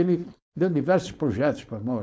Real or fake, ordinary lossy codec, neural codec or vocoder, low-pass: fake; none; codec, 16 kHz, 4.8 kbps, FACodec; none